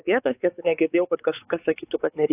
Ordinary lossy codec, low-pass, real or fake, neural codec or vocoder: AAC, 16 kbps; 3.6 kHz; fake; codec, 16 kHz, 4 kbps, X-Codec, WavLM features, trained on Multilingual LibriSpeech